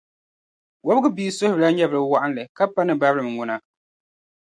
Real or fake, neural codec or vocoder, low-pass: real; none; 9.9 kHz